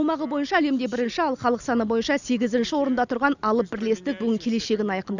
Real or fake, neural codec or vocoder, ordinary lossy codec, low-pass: real; none; none; 7.2 kHz